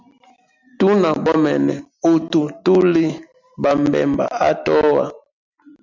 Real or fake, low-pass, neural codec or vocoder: real; 7.2 kHz; none